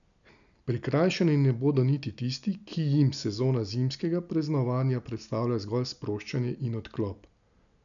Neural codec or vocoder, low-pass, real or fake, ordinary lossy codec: none; 7.2 kHz; real; none